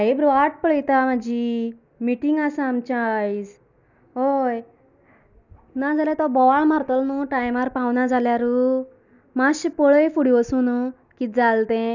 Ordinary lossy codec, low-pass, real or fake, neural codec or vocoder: none; 7.2 kHz; real; none